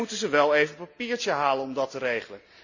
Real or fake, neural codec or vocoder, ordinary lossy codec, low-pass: real; none; none; 7.2 kHz